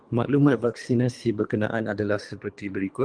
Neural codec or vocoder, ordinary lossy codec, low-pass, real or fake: codec, 24 kHz, 3 kbps, HILCodec; Opus, 24 kbps; 9.9 kHz; fake